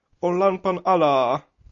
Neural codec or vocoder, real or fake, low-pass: none; real; 7.2 kHz